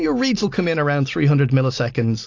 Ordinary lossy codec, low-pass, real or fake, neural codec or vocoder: AAC, 48 kbps; 7.2 kHz; real; none